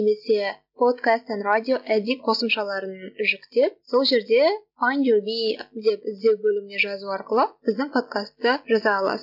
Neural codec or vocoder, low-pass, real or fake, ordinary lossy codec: none; 5.4 kHz; real; MP3, 48 kbps